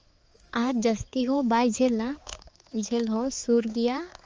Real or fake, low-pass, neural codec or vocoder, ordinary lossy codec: fake; 7.2 kHz; codec, 16 kHz, 4 kbps, X-Codec, HuBERT features, trained on balanced general audio; Opus, 24 kbps